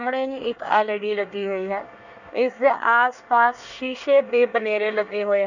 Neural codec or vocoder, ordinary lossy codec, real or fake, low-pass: codec, 24 kHz, 1 kbps, SNAC; none; fake; 7.2 kHz